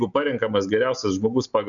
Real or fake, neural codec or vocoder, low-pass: real; none; 7.2 kHz